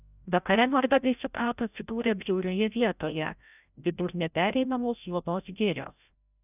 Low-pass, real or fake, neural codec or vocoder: 3.6 kHz; fake; codec, 16 kHz, 0.5 kbps, FreqCodec, larger model